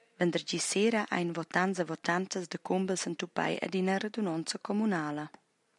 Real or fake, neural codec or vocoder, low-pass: real; none; 10.8 kHz